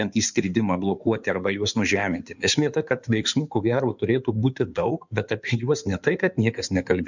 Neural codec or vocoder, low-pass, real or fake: codec, 16 kHz in and 24 kHz out, 2.2 kbps, FireRedTTS-2 codec; 7.2 kHz; fake